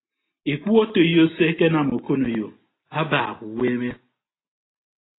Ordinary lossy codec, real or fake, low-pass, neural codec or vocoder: AAC, 16 kbps; real; 7.2 kHz; none